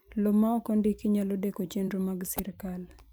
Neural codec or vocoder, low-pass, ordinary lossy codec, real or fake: vocoder, 44.1 kHz, 128 mel bands every 512 samples, BigVGAN v2; none; none; fake